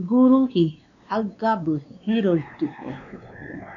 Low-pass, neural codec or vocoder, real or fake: 7.2 kHz; codec, 16 kHz, 2 kbps, X-Codec, WavLM features, trained on Multilingual LibriSpeech; fake